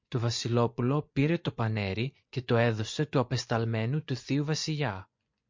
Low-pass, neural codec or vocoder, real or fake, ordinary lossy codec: 7.2 kHz; vocoder, 24 kHz, 100 mel bands, Vocos; fake; MP3, 48 kbps